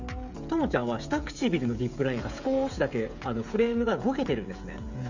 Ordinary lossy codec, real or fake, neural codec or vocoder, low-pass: none; fake; codec, 16 kHz, 16 kbps, FreqCodec, smaller model; 7.2 kHz